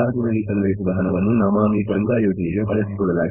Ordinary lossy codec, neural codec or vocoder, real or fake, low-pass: none; codec, 16 kHz, 4.8 kbps, FACodec; fake; 3.6 kHz